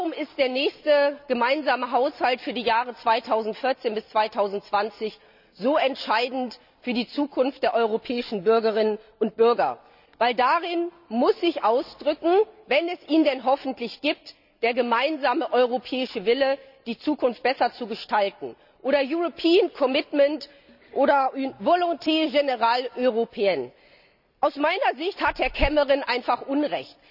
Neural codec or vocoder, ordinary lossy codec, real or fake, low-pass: none; none; real; 5.4 kHz